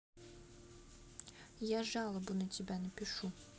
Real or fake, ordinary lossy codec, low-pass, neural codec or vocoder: real; none; none; none